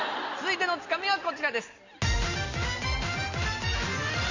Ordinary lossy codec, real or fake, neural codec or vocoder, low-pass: none; real; none; 7.2 kHz